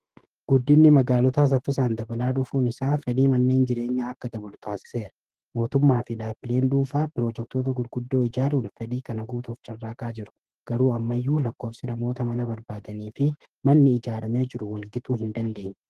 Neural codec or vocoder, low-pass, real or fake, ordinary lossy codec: autoencoder, 48 kHz, 32 numbers a frame, DAC-VAE, trained on Japanese speech; 14.4 kHz; fake; Opus, 16 kbps